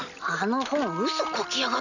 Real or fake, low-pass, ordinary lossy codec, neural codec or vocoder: real; 7.2 kHz; none; none